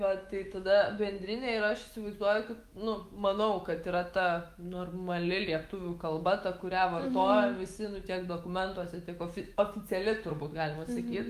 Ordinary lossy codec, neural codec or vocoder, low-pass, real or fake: Opus, 32 kbps; autoencoder, 48 kHz, 128 numbers a frame, DAC-VAE, trained on Japanese speech; 14.4 kHz; fake